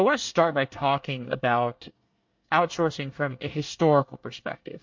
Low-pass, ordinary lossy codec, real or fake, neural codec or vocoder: 7.2 kHz; MP3, 48 kbps; fake; codec, 24 kHz, 1 kbps, SNAC